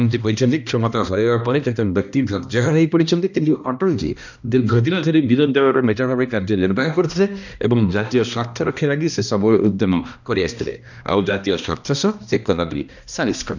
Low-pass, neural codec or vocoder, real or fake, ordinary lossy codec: 7.2 kHz; codec, 16 kHz, 1 kbps, X-Codec, HuBERT features, trained on balanced general audio; fake; none